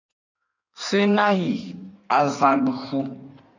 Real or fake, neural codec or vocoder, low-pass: fake; codec, 16 kHz in and 24 kHz out, 1.1 kbps, FireRedTTS-2 codec; 7.2 kHz